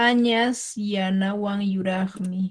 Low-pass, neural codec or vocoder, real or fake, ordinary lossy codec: 9.9 kHz; none; real; Opus, 16 kbps